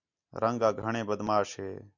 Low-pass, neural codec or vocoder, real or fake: 7.2 kHz; none; real